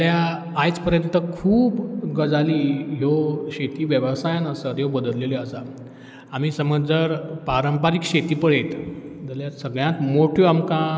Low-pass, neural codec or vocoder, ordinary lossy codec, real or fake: none; none; none; real